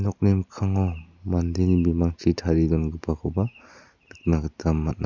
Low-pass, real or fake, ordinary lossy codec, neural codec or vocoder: 7.2 kHz; real; none; none